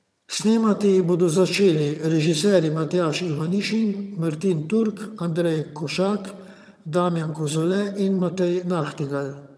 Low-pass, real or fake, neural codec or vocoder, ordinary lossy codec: none; fake; vocoder, 22.05 kHz, 80 mel bands, HiFi-GAN; none